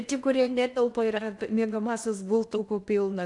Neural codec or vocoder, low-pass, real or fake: codec, 16 kHz in and 24 kHz out, 0.6 kbps, FocalCodec, streaming, 2048 codes; 10.8 kHz; fake